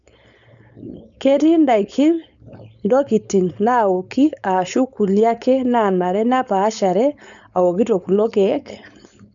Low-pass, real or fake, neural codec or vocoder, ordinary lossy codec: 7.2 kHz; fake; codec, 16 kHz, 4.8 kbps, FACodec; none